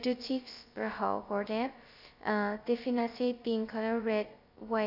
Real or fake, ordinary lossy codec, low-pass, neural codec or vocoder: fake; none; 5.4 kHz; codec, 16 kHz, 0.2 kbps, FocalCodec